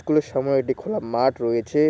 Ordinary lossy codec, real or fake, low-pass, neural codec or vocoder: none; real; none; none